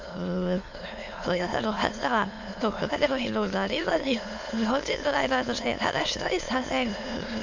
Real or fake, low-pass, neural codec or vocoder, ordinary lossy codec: fake; 7.2 kHz; autoencoder, 22.05 kHz, a latent of 192 numbers a frame, VITS, trained on many speakers; AAC, 48 kbps